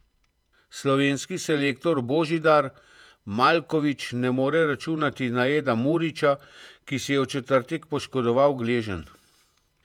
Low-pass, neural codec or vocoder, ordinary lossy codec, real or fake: 19.8 kHz; vocoder, 48 kHz, 128 mel bands, Vocos; none; fake